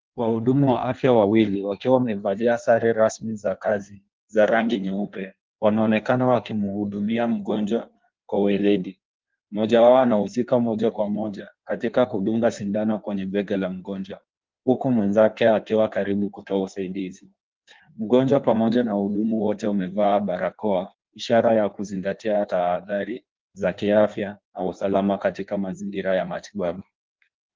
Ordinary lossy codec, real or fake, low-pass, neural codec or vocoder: Opus, 32 kbps; fake; 7.2 kHz; codec, 16 kHz in and 24 kHz out, 1.1 kbps, FireRedTTS-2 codec